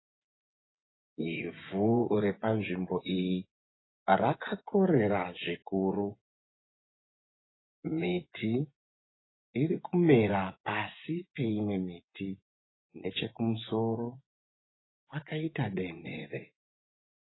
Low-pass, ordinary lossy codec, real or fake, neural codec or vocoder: 7.2 kHz; AAC, 16 kbps; fake; vocoder, 24 kHz, 100 mel bands, Vocos